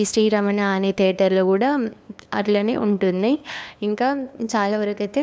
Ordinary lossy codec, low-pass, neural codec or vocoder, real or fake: none; none; codec, 16 kHz, 2 kbps, FunCodec, trained on LibriTTS, 25 frames a second; fake